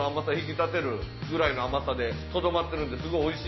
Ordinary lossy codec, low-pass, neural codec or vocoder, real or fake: MP3, 24 kbps; 7.2 kHz; none; real